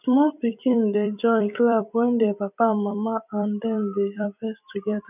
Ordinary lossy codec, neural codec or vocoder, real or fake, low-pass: none; vocoder, 44.1 kHz, 128 mel bands every 256 samples, BigVGAN v2; fake; 3.6 kHz